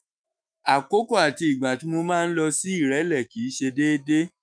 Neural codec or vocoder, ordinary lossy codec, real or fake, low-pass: autoencoder, 48 kHz, 128 numbers a frame, DAC-VAE, trained on Japanese speech; none; fake; 9.9 kHz